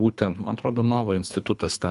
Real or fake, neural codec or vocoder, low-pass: fake; codec, 24 kHz, 3 kbps, HILCodec; 10.8 kHz